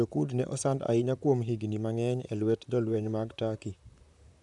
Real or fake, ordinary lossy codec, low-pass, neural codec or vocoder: fake; none; 10.8 kHz; vocoder, 44.1 kHz, 128 mel bands, Pupu-Vocoder